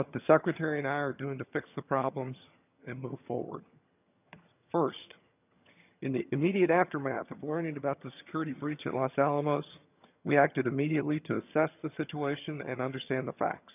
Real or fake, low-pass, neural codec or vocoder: fake; 3.6 kHz; vocoder, 22.05 kHz, 80 mel bands, HiFi-GAN